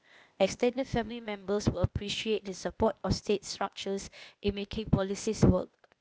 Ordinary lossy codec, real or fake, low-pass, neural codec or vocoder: none; fake; none; codec, 16 kHz, 0.8 kbps, ZipCodec